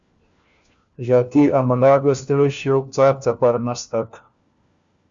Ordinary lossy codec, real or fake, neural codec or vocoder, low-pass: Opus, 64 kbps; fake; codec, 16 kHz, 1 kbps, FunCodec, trained on LibriTTS, 50 frames a second; 7.2 kHz